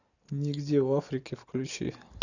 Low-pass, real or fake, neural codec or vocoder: 7.2 kHz; real; none